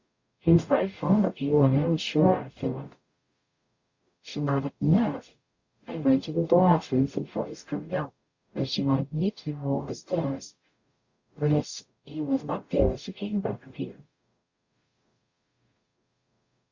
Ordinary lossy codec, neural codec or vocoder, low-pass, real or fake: Opus, 64 kbps; codec, 44.1 kHz, 0.9 kbps, DAC; 7.2 kHz; fake